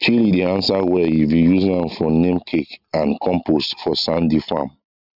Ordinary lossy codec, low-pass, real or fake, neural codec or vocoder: none; 5.4 kHz; real; none